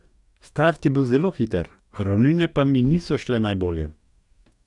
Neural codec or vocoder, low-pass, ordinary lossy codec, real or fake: codec, 44.1 kHz, 2.6 kbps, DAC; 10.8 kHz; none; fake